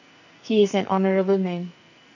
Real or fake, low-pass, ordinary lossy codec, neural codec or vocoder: fake; 7.2 kHz; none; codec, 44.1 kHz, 2.6 kbps, SNAC